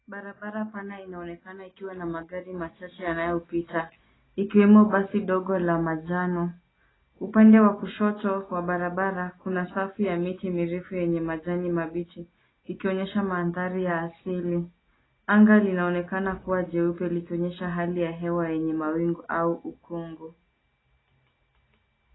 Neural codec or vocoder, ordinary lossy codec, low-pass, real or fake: none; AAC, 16 kbps; 7.2 kHz; real